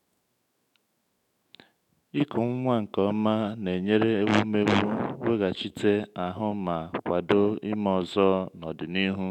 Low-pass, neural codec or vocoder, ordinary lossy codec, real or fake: 19.8 kHz; autoencoder, 48 kHz, 128 numbers a frame, DAC-VAE, trained on Japanese speech; none; fake